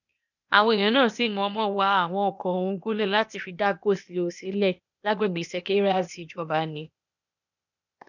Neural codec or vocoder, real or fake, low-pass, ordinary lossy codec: codec, 16 kHz, 0.8 kbps, ZipCodec; fake; 7.2 kHz; none